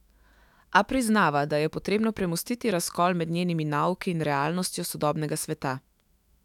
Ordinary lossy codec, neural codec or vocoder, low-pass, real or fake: none; autoencoder, 48 kHz, 128 numbers a frame, DAC-VAE, trained on Japanese speech; 19.8 kHz; fake